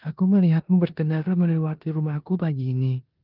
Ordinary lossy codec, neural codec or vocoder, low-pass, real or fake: Opus, 24 kbps; codec, 16 kHz in and 24 kHz out, 0.9 kbps, LongCat-Audio-Codec, four codebook decoder; 5.4 kHz; fake